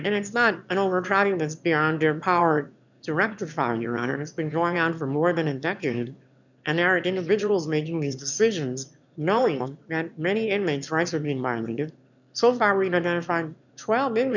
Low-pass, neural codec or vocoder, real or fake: 7.2 kHz; autoencoder, 22.05 kHz, a latent of 192 numbers a frame, VITS, trained on one speaker; fake